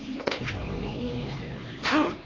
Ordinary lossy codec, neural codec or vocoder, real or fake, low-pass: AAC, 32 kbps; codec, 16 kHz, 2 kbps, X-Codec, HuBERT features, trained on LibriSpeech; fake; 7.2 kHz